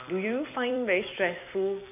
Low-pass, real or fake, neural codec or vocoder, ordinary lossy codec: 3.6 kHz; fake; vocoder, 44.1 kHz, 128 mel bands every 256 samples, BigVGAN v2; none